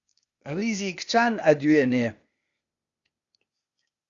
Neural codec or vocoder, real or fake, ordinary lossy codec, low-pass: codec, 16 kHz, 0.8 kbps, ZipCodec; fake; Opus, 64 kbps; 7.2 kHz